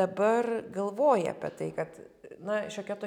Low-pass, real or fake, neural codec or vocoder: 19.8 kHz; real; none